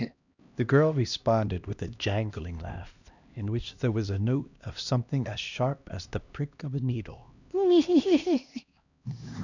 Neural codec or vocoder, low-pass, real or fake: codec, 16 kHz, 1 kbps, X-Codec, HuBERT features, trained on LibriSpeech; 7.2 kHz; fake